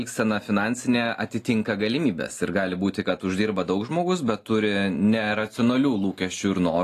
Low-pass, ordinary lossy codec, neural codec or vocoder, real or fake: 14.4 kHz; AAC, 48 kbps; none; real